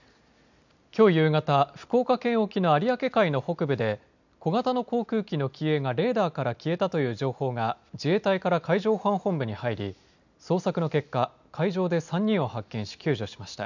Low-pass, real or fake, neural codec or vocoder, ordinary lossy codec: 7.2 kHz; real; none; none